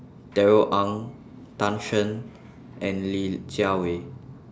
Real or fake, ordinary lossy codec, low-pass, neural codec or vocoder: real; none; none; none